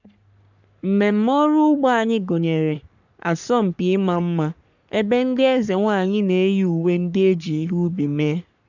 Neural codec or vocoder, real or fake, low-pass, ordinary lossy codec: codec, 44.1 kHz, 3.4 kbps, Pupu-Codec; fake; 7.2 kHz; none